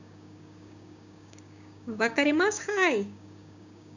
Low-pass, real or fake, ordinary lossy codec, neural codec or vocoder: 7.2 kHz; fake; none; codec, 16 kHz, 6 kbps, DAC